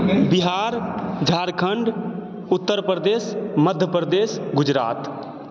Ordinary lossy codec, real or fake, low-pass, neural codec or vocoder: none; real; none; none